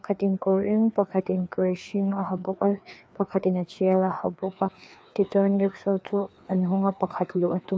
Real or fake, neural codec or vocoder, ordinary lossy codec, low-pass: fake; codec, 16 kHz, 2 kbps, FreqCodec, larger model; none; none